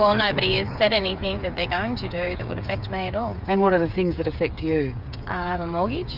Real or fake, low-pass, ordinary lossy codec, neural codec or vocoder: fake; 5.4 kHz; Opus, 64 kbps; codec, 16 kHz, 8 kbps, FreqCodec, smaller model